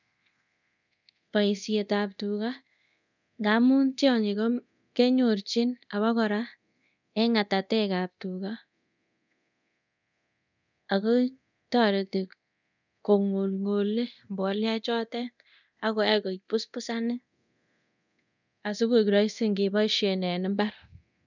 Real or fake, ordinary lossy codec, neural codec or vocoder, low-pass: fake; none; codec, 24 kHz, 0.9 kbps, DualCodec; 7.2 kHz